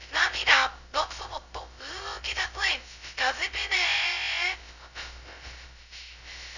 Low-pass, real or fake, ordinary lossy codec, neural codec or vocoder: 7.2 kHz; fake; none; codec, 16 kHz, 0.2 kbps, FocalCodec